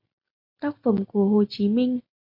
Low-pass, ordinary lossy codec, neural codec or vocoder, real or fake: 5.4 kHz; MP3, 32 kbps; none; real